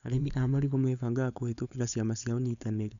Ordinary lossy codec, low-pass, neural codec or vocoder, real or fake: none; 7.2 kHz; codec, 16 kHz, 4.8 kbps, FACodec; fake